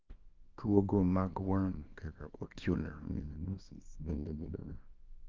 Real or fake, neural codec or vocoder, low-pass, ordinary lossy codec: fake; codec, 24 kHz, 0.9 kbps, WavTokenizer, small release; 7.2 kHz; Opus, 24 kbps